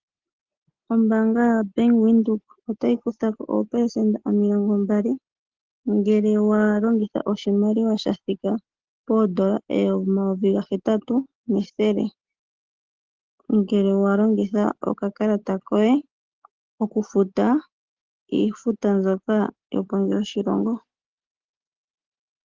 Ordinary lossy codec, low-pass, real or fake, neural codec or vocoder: Opus, 16 kbps; 7.2 kHz; real; none